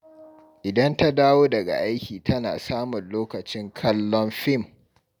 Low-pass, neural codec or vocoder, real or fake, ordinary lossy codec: none; none; real; none